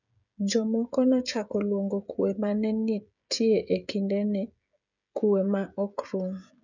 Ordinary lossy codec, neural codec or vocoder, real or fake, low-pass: none; codec, 16 kHz, 16 kbps, FreqCodec, smaller model; fake; 7.2 kHz